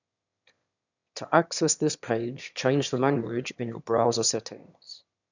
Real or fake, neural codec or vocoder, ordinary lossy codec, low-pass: fake; autoencoder, 22.05 kHz, a latent of 192 numbers a frame, VITS, trained on one speaker; none; 7.2 kHz